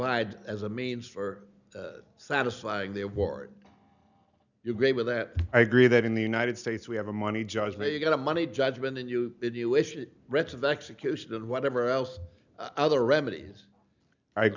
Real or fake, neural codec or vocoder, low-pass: real; none; 7.2 kHz